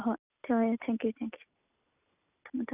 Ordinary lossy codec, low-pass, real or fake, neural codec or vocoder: none; 3.6 kHz; real; none